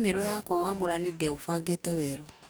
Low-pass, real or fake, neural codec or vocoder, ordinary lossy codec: none; fake; codec, 44.1 kHz, 2.6 kbps, DAC; none